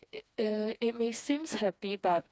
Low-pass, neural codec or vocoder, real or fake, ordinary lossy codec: none; codec, 16 kHz, 2 kbps, FreqCodec, smaller model; fake; none